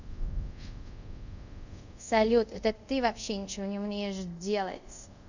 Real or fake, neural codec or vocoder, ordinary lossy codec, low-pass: fake; codec, 24 kHz, 0.5 kbps, DualCodec; none; 7.2 kHz